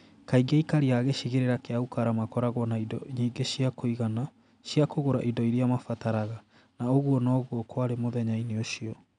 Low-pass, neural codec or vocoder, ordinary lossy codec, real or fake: 9.9 kHz; none; none; real